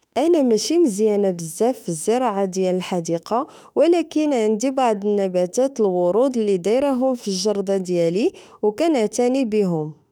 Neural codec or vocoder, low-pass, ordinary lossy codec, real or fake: autoencoder, 48 kHz, 32 numbers a frame, DAC-VAE, trained on Japanese speech; 19.8 kHz; none; fake